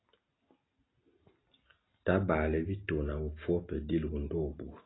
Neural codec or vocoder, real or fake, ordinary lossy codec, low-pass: none; real; AAC, 16 kbps; 7.2 kHz